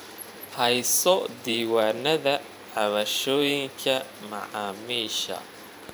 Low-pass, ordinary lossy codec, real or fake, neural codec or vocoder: none; none; fake; vocoder, 44.1 kHz, 128 mel bands every 512 samples, BigVGAN v2